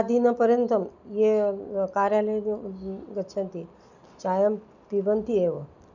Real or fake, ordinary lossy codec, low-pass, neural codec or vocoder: real; none; 7.2 kHz; none